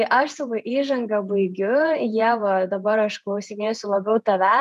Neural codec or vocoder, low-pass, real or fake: vocoder, 48 kHz, 128 mel bands, Vocos; 14.4 kHz; fake